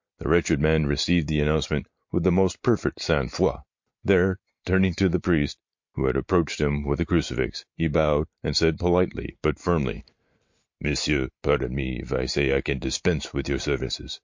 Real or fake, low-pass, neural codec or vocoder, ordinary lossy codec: fake; 7.2 kHz; vocoder, 44.1 kHz, 128 mel bands every 512 samples, BigVGAN v2; MP3, 48 kbps